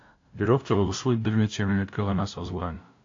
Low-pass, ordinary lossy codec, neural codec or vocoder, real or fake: 7.2 kHz; AAC, 48 kbps; codec, 16 kHz, 0.5 kbps, FunCodec, trained on LibriTTS, 25 frames a second; fake